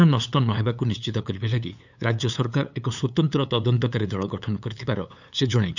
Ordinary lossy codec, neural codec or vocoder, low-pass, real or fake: none; codec, 16 kHz, 8 kbps, FunCodec, trained on LibriTTS, 25 frames a second; 7.2 kHz; fake